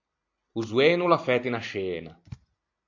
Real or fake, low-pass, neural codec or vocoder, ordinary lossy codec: real; 7.2 kHz; none; AAC, 48 kbps